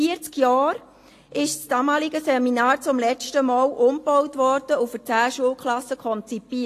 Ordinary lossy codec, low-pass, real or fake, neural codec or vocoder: AAC, 48 kbps; 14.4 kHz; real; none